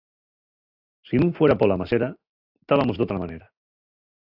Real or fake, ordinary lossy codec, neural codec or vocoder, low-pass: real; AAC, 48 kbps; none; 5.4 kHz